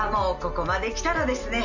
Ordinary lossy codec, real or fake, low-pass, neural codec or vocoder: none; real; 7.2 kHz; none